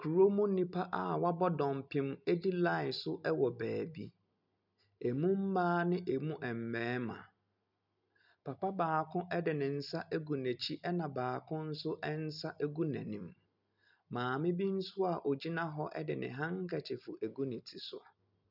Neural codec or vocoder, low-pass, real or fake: none; 5.4 kHz; real